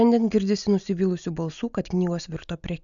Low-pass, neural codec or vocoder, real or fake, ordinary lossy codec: 7.2 kHz; none; real; MP3, 96 kbps